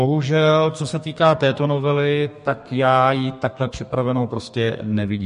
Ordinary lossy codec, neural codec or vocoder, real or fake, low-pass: MP3, 48 kbps; codec, 32 kHz, 1.9 kbps, SNAC; fake; 14.4 kHz